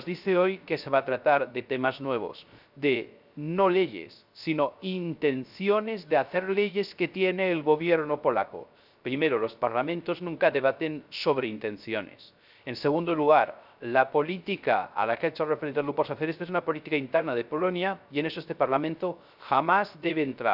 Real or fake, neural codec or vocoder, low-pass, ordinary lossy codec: fake; codec, 16 kHz, 0.3 kbps, FocalCodec; 5.4 kHz; none